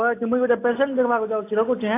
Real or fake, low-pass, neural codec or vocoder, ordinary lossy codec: real; 3.6 kHz; none; AAC, 24 kbps